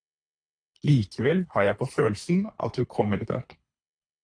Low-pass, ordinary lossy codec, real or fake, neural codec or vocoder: 9.9 kHz; AAC, 64 kbps; fake; codec, 24 kHz, 3 kbps, HILCodec